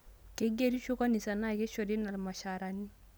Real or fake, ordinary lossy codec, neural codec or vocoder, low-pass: real; none; none; none